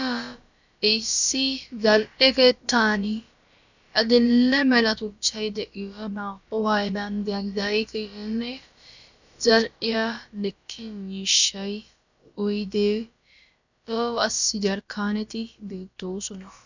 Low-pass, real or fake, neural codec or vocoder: 7.2 kHz; fake; codec, 16 kHz, about 1 kbps, DyCAST, with the encoder's durations